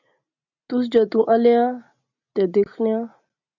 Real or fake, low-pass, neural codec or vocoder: real; 7.2 kHz; none